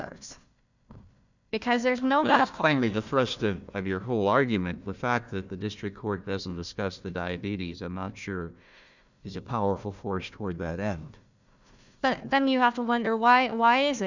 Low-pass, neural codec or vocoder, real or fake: 7.2 kHz; codec, 16 kHz, 1 kbps, FunCodec, trained on Chinese and English, 50 frames a second; fake